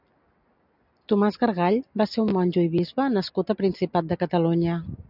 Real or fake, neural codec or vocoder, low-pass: real; none; 5.4 kHz